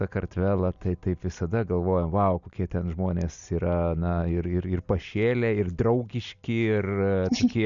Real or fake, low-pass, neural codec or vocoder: real; 7.2 kHz; none